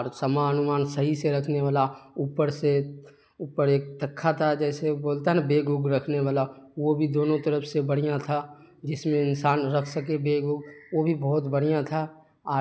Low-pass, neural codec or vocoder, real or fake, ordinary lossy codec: none; none; real; none